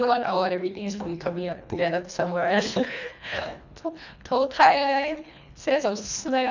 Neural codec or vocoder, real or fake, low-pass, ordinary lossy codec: codec, 24 kHz, 1.5 kbps, HILCodec; fake; 7.2 kHz; none